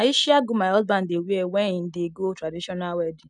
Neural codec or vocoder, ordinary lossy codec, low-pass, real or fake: none; none; 10.8 kHz; real